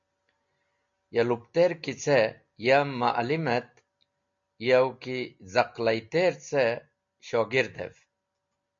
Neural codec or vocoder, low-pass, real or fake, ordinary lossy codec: none; 7.2 kHz; real; MP3, 48 kbps